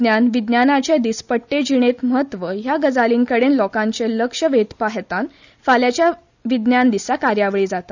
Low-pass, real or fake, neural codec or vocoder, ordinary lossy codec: 7.2 kHz; real; none; none